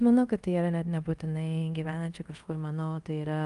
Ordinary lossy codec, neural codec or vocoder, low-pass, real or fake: Opus, 32 kbps; codec, 24 kHz, 0.5 kbps, DualCodec; 10.8 kHz; fake